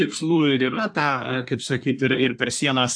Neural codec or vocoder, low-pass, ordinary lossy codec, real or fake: codec, 24 kHz, 1 kbps, SNAC; 9.9 kHz; AAC, 64 kbps; fake